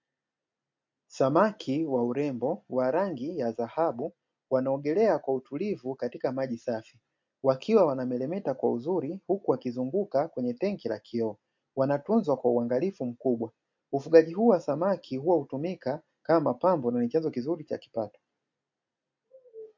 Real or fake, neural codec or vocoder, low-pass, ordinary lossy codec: real; none; 7.2 kHz; MP3, 48 kbps